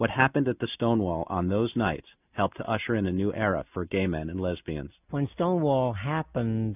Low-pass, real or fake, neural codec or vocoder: 3.6 kHz; real; none